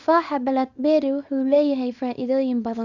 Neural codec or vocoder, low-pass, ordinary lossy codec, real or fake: codec, 24 kHz, 0.9 kbps, WavTokenizer, small release; 7.2 kHz; none; fake